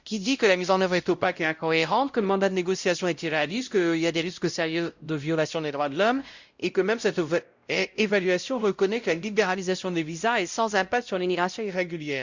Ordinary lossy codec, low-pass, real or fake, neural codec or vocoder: Opus, 64 kbps; 7.2 kHz; fake; codec, 16 kHz, 0.5 kbps, X-Codec, WavLM features, trained on Multilingual LibriSpeech